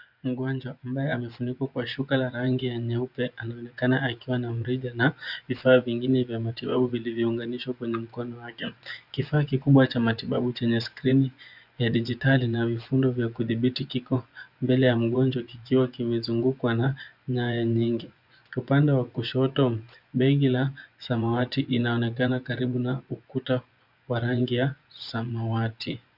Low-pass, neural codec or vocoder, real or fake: 5.4 kHz; vocoder, 24 kHz, 100 mel bands, Vocos; fake